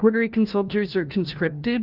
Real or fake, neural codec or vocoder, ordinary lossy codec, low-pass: fake; codec, 16 kHz, 1 kbps, FunCodec, trained on LibriTTS, 50 frames a second; Opus, 32 kbps; 5.4 kHz